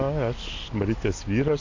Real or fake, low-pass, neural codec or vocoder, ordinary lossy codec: real; 7.2 kHz; none; AAC, 48 kbps